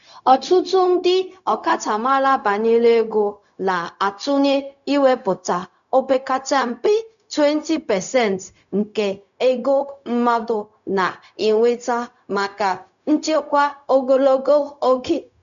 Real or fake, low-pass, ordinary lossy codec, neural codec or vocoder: fake; 7.2 kHz; none; codec, 16 kHz, 0.4 kbps, LongCat-Audio-Codec